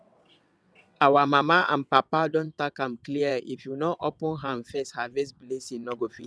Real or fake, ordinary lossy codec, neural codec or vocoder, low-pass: fake; none; vocoder, 22.05 kHz, 80 mel bands, WaveNeXt; none